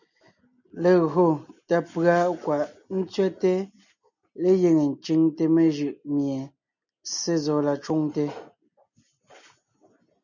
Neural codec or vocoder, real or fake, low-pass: none; real; 7.2 kHz